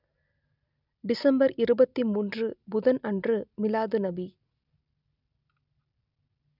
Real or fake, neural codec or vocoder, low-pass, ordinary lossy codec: real; none; 5.4 kHz; none